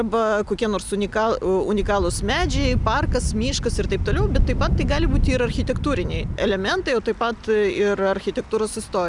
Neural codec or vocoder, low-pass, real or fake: none; 10.8 kHz; real